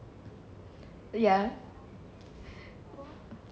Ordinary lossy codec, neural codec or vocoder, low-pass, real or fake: none; none; none; real